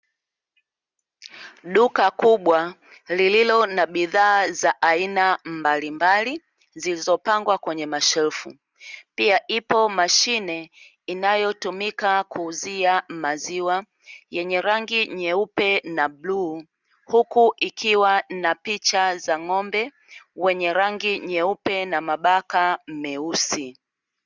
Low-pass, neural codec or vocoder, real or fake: 7.2 kHz; none; real